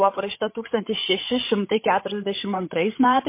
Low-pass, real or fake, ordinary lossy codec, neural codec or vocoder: 3.6 kHz; fake; MP3, 24 kbps; codec, 16 kHz, 8 kbps, FreqCodec, larger model